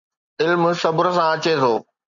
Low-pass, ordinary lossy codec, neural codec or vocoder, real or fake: 7.2 kHz; MP3, 64 kbps; none; real